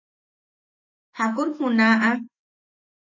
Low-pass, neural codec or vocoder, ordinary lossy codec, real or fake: 7.2 kHz; autoencoder, 48 kHz, 128 numbers a frame, DAC-VAE, trained on Japanese speech; MP3, 32 kbps; fake